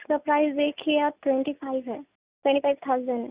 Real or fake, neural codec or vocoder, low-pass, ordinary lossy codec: real; none; 3.6 kHz; Opus, 64 kbps